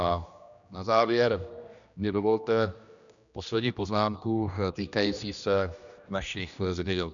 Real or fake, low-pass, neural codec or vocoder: fake; 7.2 kHz; codec, 16 kHz, 1 kbps, X-Codec, HuBERT features, trained on general audio